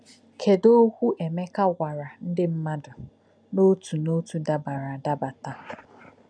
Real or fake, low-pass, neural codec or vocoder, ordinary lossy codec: real; 9.9 kHz; none; none